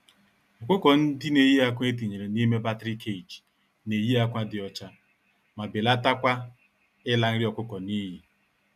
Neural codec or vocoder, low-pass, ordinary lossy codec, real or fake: none; 14.4 kHz; none; real